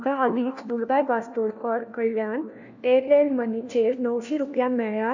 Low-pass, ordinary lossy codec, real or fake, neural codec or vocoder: 7.2 kHz; none; fake; codec, 16 kHz, 1 kbps, FunCodec, trained on LibriTTS, 50 frames a second